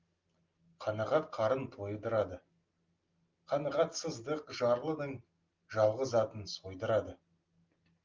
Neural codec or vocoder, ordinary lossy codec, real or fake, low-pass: none; Opus, 32 kbps; real; 7.2 kHz